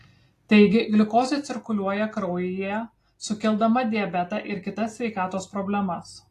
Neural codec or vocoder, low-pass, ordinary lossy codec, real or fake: none; 14.4 kHz; AAC, 48 kbps; real